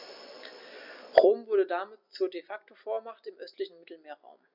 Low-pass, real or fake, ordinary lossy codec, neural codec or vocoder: 5.4 kHz; real; none; none